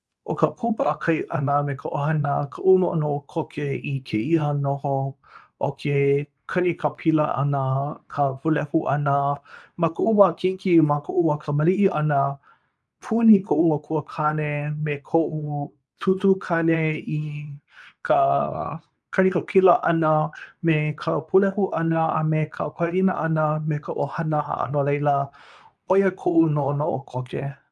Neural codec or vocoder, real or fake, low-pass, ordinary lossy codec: codec, 24 kHz, 0.9 kbps, WavTokenizer, medium speech release version 2; fake; none; none